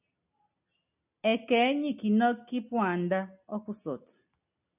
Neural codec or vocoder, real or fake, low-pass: none; real; 3.6 kHz